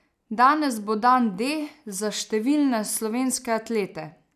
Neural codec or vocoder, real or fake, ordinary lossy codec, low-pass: none; real; none; 14.4 kHz